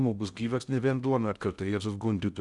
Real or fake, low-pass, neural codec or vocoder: fake; 10.8 kHz; codec, 16 kHz in and 24 kHz out, 0.6 kbps, FocalCodec, streaming, 2048 codes